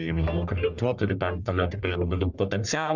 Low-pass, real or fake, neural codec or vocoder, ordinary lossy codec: 7.2 kHz; fake; codec, 44.1 kHz, 1.7 kbps, Pupu-Codec; Opus, 64 kbps